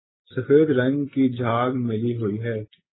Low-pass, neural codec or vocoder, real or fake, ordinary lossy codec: 7.2 kHz; none; real; AAC, 16 kbps